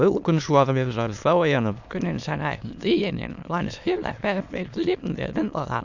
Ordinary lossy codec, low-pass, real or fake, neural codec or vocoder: none; 7.2 kHz; fake; autoencoder, 22.05 kHz, a latent of 192 numbers a frame, VITS, trained on many speakers